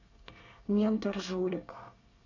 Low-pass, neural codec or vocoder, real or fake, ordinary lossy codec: 7.2 kHz; codec, 24 kHz, 1 kbps, SNAC; fake; none